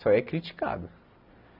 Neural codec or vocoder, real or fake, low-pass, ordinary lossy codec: none; real; 5.4 kHz; none